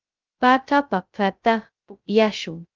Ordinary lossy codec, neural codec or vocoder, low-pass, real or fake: Opus, 24 kbps; codec, 16 kHz, 0.2 kbps, FocalCodec; 7.2 kHz; fake